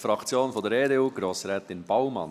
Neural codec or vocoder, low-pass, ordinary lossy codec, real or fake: none; 14.4 kHz; none; real